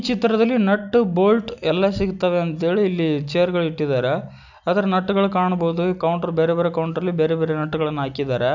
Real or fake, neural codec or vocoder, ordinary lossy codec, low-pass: real; none; none; 7.2 kHz